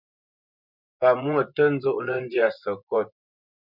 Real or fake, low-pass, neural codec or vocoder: fake; 5.4 kHz; vocoder, 24 kHz, 100 mel bands, Vocos